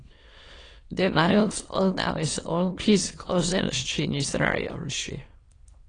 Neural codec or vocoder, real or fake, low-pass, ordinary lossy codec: autoencoder, 22.05 kHz, a latent of 192 numbers a frame, VITS, trained on many speakers; fake; 9.9 kHz; AAC, 32 kbps